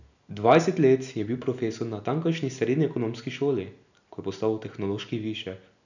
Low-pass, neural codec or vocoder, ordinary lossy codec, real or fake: 7.2 kHz; none; none; real